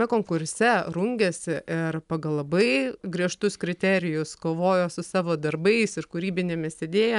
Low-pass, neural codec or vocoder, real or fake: 10.8 kHz; none; real